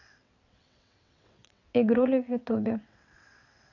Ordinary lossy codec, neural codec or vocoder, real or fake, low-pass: none; vocoder, 22.05 kHz, 80 mel bands, WaveNeXt; fake; 7.2 kHz